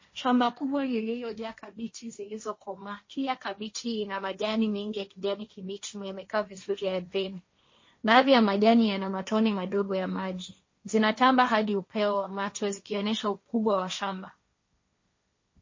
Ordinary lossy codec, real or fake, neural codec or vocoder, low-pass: MP3, 32 kbps; fake; codec, 16 kHz, 1.1 kbps, Voila-Tokenizer; 7.2 kHz